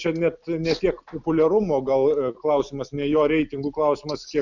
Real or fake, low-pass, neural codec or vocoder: real; 7.2 kHz; none